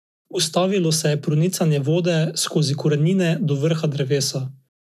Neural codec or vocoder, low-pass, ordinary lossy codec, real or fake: none; 14.4 kHz; none; real